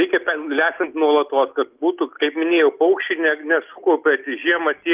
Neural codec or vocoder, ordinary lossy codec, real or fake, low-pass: none; Opus, 32 kbps; real; 3.6 kHz